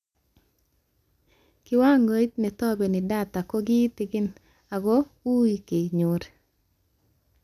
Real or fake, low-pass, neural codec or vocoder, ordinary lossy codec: real; 14.4 kHz; none; none